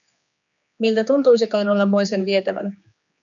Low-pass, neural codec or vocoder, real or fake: 7.2 kHz; codec, 16 kHz, 2 kbps, X-Codec, HuBERT features, trained on general audio; fake